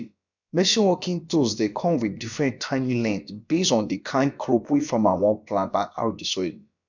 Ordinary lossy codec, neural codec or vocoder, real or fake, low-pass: none; codec, 16 kHz, about 1 kbps, DyCAST, with the encoder's durations; fake; 7.2 kHz